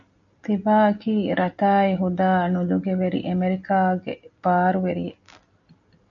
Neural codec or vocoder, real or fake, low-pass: none; real; 7.2 kHz